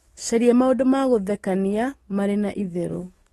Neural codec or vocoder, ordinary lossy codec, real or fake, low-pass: autoencoder, 48 kHz, 128 numbers a frame, DAC-VAE, trained on Japanese speech; AAC, 32 kbps; fake; 19.8 kHz